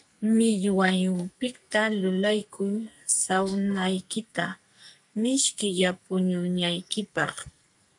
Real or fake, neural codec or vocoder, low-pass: fake; codec, 44.1 kHz, 2.6 kbps, SNAC; 10.8 kHz